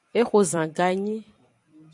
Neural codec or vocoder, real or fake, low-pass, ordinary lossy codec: vocoder, 44.1 kHz, 128 mel bands every 256 samples, BigVGAN v2; fake; 10.8 kHz; MP3, 96 kbps